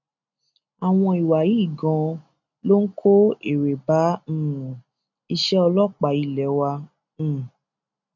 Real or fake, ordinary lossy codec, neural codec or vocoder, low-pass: real; none; none; 7.2 kHz